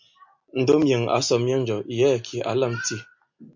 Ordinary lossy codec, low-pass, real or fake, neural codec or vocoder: MP3, 48 kbps; 7.2 kHz; real; none